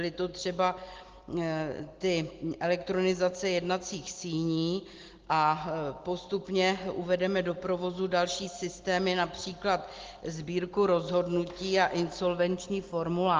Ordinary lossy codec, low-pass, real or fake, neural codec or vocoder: Opus, 16 kbps; 7.2 kHz; real; none